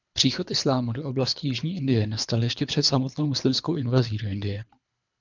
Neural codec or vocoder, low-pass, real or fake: codec, 24 kHz, 3 kbps, HILCodec; 7.2 kHz; fake